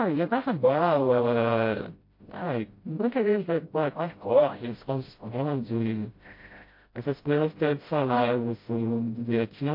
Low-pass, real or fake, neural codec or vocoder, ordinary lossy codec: 5.4 kHz; fake; codec, 16 kHz, 0.5 kbps, FreqCodec, smaller model; MP3, 32 kbps